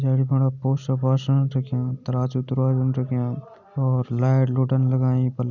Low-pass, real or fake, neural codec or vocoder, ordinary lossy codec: 7.2 kHz; real; none; none